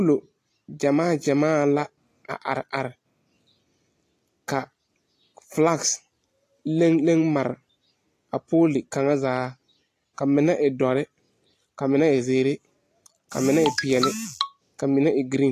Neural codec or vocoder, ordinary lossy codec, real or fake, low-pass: none; AAC, 48 kbps; real; 14.4 kHz